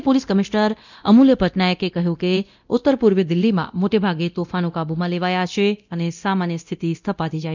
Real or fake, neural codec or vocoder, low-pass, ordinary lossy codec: fake; codec, 24 kHz, 0.9 kbps, DualCodec; 7.2 kHz; none